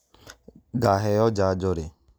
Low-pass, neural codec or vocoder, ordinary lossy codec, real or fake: none; none; none; real